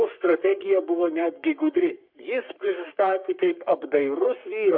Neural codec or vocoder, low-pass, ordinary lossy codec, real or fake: codec, 44.1 kHz, 3.4 kbps, Pupu-Codec; 5.4 kHz; MP3, 48 kbps; fake